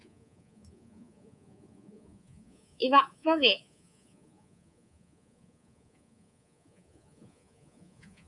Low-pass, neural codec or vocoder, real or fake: 10.8 kHz; codec, 24 kHz, 3.1 kbps, DualCodec; fake